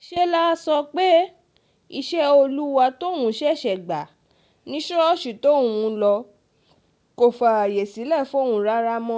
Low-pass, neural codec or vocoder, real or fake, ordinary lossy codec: none; none; real; none